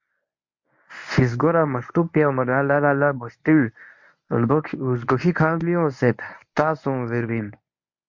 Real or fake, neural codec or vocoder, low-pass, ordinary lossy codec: fake; codec, 24 kHz, 0.9 kbps, WavTokenizer, medium speech release version 1; 7.2 kHz; MP3, 48 kbps